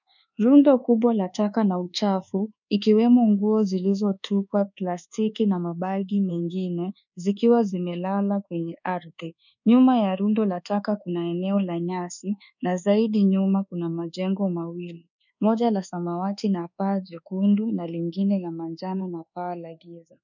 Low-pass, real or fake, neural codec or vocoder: 7.2 kHz; fake; codec, 24 kHz, 1.2 kbps, DualCodec